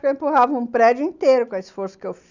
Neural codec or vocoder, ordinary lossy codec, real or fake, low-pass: none; none; real; 7.2 kHz